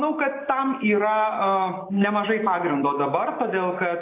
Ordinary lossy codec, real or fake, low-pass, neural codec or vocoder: AAC, 24 kbps; real; 3.6 kHz; none